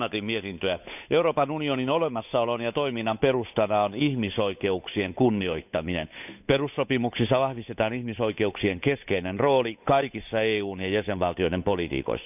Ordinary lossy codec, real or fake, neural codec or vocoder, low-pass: none; fake; codec, 16 kHz, 8 kbps, FunCodec, trained on Chinese and English, 25 frames a second; 3.6 kHz